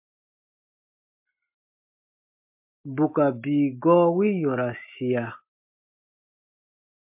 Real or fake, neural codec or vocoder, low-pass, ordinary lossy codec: real; none; 3.6 kHz; MP3, 32 kbps